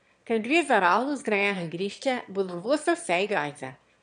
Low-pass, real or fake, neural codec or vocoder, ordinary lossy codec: 9.9 kHz; fake; autoencoder, 22.05 kHz, a latent of 192 numbers a frame, VITS, trained on one speaker; MP3, 64 kbps